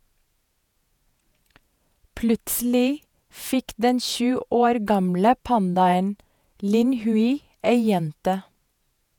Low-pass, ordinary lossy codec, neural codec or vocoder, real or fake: 19.8 kHz; none; vocoder, 48 kHz, 128 mel bands, Vocos; fake